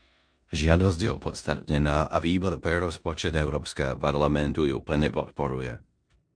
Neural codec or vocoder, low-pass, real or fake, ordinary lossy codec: codec, 16 kHz in and 24 kHz out, 0.9 kbps, LongCat-Audio-Codec, four codebook decoder; 9.9 kHz; fake; MP3, 64 kbps